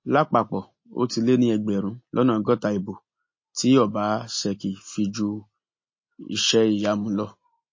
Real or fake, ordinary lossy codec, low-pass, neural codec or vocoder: real; MP3, 32 kbps; 7.2 kHz; none